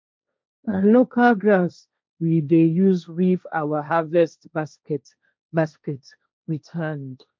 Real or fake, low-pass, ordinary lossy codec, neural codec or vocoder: fake; none; none; codec, 16 kHz, 1.1 kbps, Voila-Tokenizer